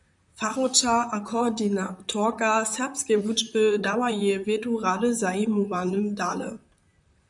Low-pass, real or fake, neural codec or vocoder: 10.8 kHz; fake; vocoder, 44.1 kHz, 128 mel bands, Pupu-Vocoder